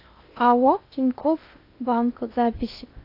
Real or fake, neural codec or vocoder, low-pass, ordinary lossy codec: fake; codec, 16 kHz in and 24 kHz out, 0.6 kbps, FocalCodec, streaming, 2048 codes; 5.4 kHz; AAC, 32 kbps